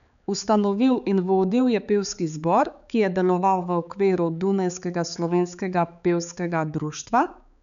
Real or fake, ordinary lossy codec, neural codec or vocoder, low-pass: fake; none; codec, 16 kHz, 4 kbps, X-Codec, HuBERT features, trained on balanced general audio; 7.2 kHz